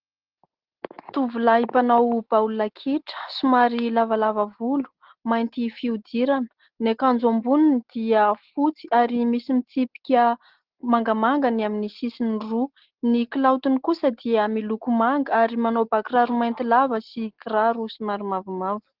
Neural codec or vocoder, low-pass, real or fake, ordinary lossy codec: none; 5.4 kHz; real; Opus, 16 kbps